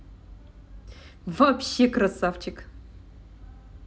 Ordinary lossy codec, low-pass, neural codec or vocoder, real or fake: none; none; none; real